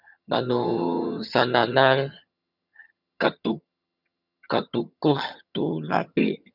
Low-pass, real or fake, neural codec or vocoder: 5.4 kHz; fake; vocoder, 22.05 kHz, 80 mel bands, HiFi-GAN